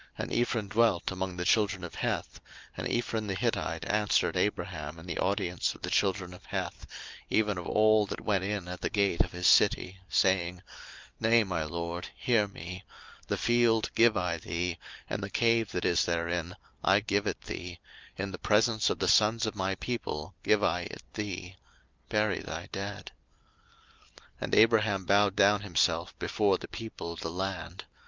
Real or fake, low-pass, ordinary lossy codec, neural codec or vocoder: real; 7.2 kHz; Opus, 24 kbps; none